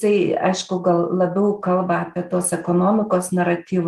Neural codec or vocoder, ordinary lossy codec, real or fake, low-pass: none; Opus, 24 kbps; real; 14.4 kHz